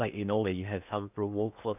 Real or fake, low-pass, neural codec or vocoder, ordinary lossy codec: fake; 3.6 kHz; codec, 16 kHz in and 24 kHz out, 0.6 kbps, FocalCodec, streaming, 4096 codes; none